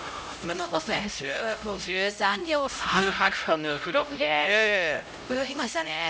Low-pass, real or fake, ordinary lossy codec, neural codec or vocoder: none; fake; none; codec, 16 kHz, 0.5 kbps, X-Codec, HuBERT features, trained on LibriSpeech